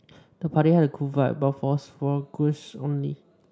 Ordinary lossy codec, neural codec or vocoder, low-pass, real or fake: none; none; none; real